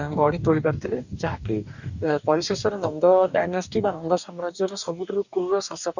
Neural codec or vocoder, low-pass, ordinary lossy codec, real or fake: codec, 44.1 kHz, 2.6 kbps, DAC; 7.2 kHz; none; fake